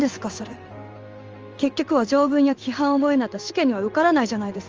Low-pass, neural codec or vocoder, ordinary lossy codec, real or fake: 7.2 kHz; codec, 16 kHz in and 24 kHz out, 1 kbps, XY-Tokenizer; Opus, 24 kbps; fake